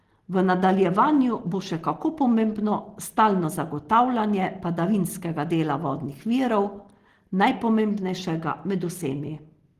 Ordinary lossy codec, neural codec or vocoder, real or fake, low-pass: Opus, 16 kbps; none; real; 14.4 kHz